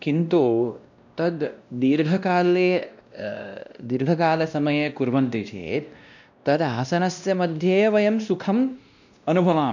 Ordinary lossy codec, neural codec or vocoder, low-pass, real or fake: none; codec, 16 kHz, 1 kbps, X-Codec, WavLM features, trained on Multilingual LibriSpeech; 7.2 kHz; fake